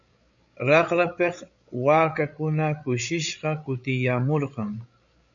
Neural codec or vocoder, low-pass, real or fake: codec, 16 kHz, 8 kbps, FreqCodec, larger model; 7.2 kHz; fake